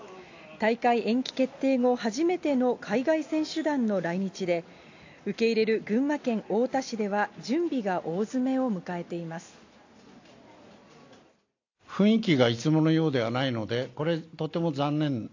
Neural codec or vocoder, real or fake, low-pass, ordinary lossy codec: none; real; 7.2 kHz; AAC, 48 kbps